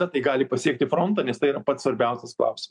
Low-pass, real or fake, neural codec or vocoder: 10.8 kHz; real; none